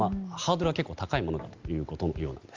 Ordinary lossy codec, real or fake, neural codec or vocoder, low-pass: Opus, 32 kbps; real; none; 7.2 kHz